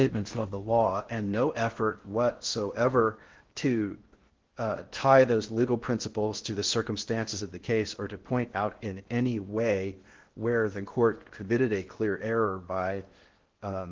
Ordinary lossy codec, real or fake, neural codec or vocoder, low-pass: Opus, 16 kbps; fake; codec, 16 kHz in and 24 kHz out, 0.6 kbps, FocalCodec, streaming, 4096 codes; 7.2 kHz